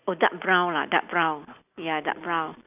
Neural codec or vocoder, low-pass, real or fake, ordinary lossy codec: none; 3.6 kHz; real; none